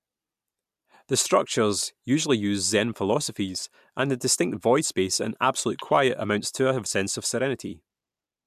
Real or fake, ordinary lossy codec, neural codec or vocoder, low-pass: real; MP3, 96 kbps; none; 14.4 kHz